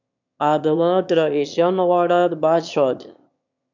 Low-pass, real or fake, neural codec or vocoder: 7.2 kHz; fake; autoencoder, 22.05 kHz, a latent of 192 numbers a frame, VITS, trained on one speaker